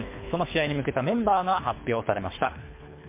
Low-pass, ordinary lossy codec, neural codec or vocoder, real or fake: 3.6 kHz; MP3, 24 kbps; codec, 24 kHz, 3 kbps, HILCodec; fake